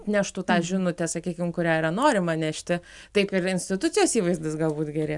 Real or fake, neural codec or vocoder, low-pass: real; none; 10.8 kHz